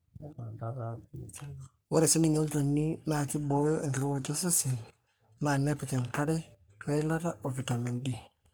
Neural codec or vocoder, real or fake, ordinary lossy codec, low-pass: codec, 44.1 kHz, 3.4 kbps, Pupu-Codec; fake; none; none